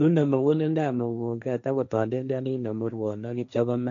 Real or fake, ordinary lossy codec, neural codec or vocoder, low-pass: fake; none; codec, 16 kHz, 1.1 kbps, Voila-Tokenizer; 7.2 kHz